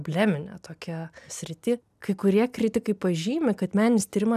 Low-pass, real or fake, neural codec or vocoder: 14.4 kHz; real; none